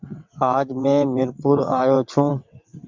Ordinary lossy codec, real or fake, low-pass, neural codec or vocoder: MP3, 64 kbps; fake; 7.2 kHz; vocoder, 22.05 kHz, 80 mel bands, WaveNeXt